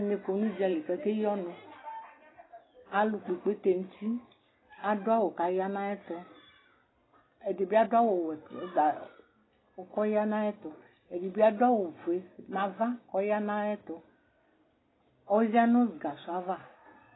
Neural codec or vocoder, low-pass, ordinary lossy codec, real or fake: none; 7.2 kHz; AAC, 16 kbps; real